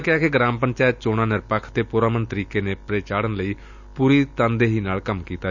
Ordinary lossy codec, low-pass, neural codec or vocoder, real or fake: none; 7.2 kHz; none; real